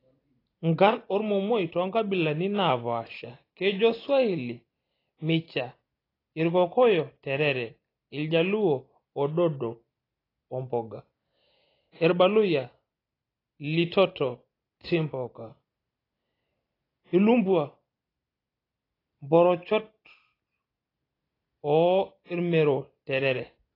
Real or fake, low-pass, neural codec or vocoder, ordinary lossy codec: real; 5.4 kHz; none; AAC, 24 kbps